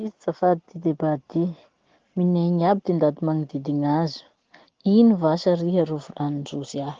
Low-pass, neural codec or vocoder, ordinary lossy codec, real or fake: 7.2 kHz; none; Opus, 16 kbps; real